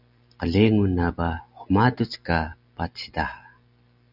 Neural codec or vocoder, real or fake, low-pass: none; real; 5.4 kHz